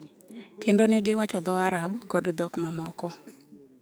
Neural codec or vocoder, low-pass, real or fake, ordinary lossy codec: codec, 44.1 kHz, 2.6 kbps, SNAC; none; fake; none